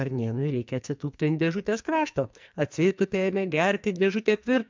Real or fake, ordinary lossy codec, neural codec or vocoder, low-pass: fake; MP3, 64 kbps; codec, 44.1 kHz, 2.6 kbps, SNAC; 7.2 kHz